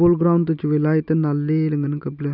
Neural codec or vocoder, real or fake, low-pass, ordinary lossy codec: none; real; 5.4 kHz; none